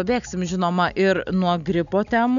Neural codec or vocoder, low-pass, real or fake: none; 7.2 kHz; real